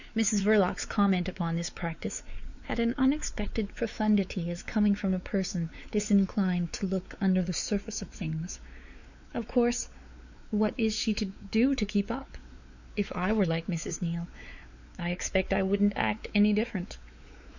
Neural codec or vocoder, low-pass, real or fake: codec, 16 kHz, 4 kbps, X-Codec, WavLM features, trained on Multilingual LibriSpeech; 7.2 kHz; fake